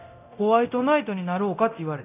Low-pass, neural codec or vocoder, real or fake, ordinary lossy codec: 3.6 kHz; codec, 24 kHz, 0.9 kbps, DualCodec; fake; none